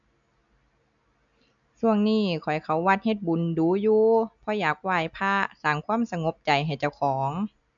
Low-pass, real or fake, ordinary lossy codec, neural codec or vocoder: 7.2 kHz; real; none; none